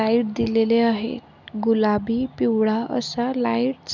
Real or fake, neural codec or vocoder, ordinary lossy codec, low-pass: real; none; none; 7.2 kHz